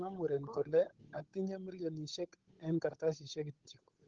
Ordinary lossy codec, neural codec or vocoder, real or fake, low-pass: Opus, 16 kbps; codec, 16 kHz, 8 kbps, FunCodec, trained on Chinese and English, 25 frames a second; fake; 7.2 kHz